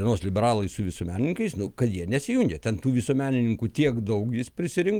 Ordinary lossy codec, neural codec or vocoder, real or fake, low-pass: Opus, 32 kbps; none; real; 19.8 kHz